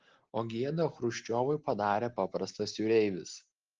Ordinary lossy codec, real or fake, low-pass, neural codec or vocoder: Opus, 16 kbps; real; 7.2 kHz; none